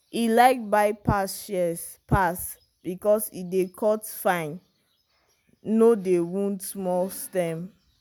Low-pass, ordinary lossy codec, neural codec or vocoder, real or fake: none; none; none; real